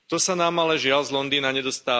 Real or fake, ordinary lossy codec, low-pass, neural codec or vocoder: real; none; none; none